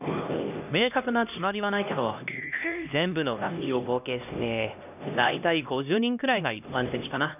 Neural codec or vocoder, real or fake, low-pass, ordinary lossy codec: codec, 16 kHz, 1 kbps, X-Codec, HuBERT features, trained on LibriSpeech; fake; 3.6 kHz; none